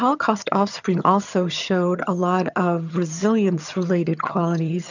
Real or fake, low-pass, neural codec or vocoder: fake; 7.2 kHz; vocoder, 22.05 kHz, 80 mel bands, HiFi-GAN